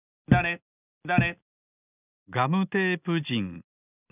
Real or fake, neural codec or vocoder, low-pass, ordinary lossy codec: real; none; 3.6 kHz; none